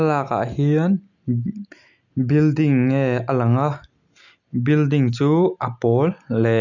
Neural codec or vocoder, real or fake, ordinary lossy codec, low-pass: none; real; none; 7.2 kHz